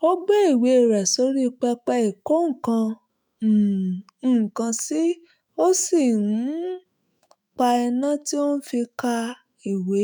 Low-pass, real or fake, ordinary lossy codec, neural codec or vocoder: none; fake; none; autoencoder, 48 kHz, 128 numbers a frame, DAC-VAE, trained on Japanese speech